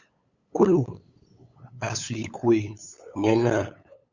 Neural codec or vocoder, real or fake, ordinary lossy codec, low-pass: codec, 16 kHz, 8 kbps, FunCodec, trained on LibriTTS, 25 frames a second; fake; Opus, 64 kbps; 7.2 kHz